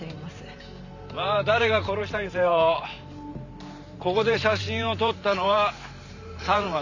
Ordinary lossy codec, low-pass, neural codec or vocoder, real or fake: none; 7.2 kHz; vocoder, 44.1 kHz, 128 mel bands every 512 samples, BigVGAN v2; fake